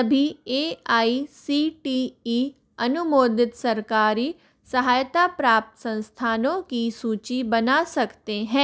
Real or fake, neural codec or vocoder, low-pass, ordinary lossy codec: real; none; none; none